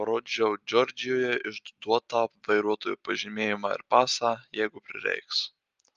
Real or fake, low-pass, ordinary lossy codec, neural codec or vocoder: real; 7.2 kHz; Opus, 32 kbps; none